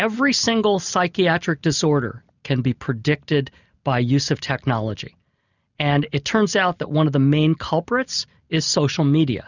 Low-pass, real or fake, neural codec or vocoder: 7.2 kHz; real; none